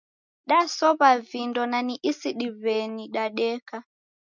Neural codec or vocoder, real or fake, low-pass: none; real; 7.2 kHz